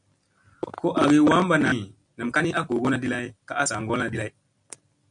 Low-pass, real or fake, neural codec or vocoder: 9.9 kHz; real; none